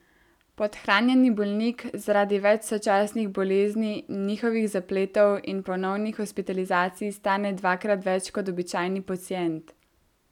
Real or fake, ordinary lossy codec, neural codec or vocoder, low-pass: real; none; none; 19.8 kHz